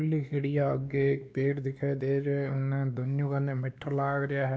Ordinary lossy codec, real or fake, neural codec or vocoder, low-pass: none; fake; codec, 16 kHz, 2 kbps, X-Codec, WavLM features, trained on Multilingual LibriSpeech; none